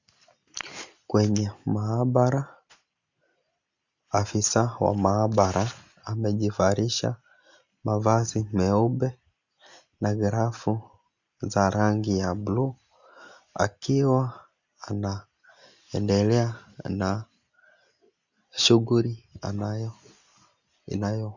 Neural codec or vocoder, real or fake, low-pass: none; real; 7.2 kHz